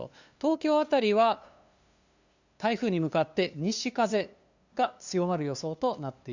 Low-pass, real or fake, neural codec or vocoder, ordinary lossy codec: 7.2 kHz; fake; codec, 16 kHz, 2 kbps, FunCodec, trained on LibriTTS, 25 frames a second; Opus, 64 kbps